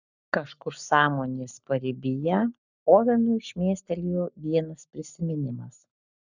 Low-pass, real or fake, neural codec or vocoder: 7.2 kHz; fake; codec, 44.1 kHz, 7.8 kbps, Pupu-Codec